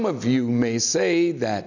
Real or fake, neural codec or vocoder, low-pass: real; none; 7.2 kHz